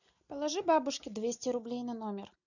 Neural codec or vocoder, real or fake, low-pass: none; real; 7.2 kHz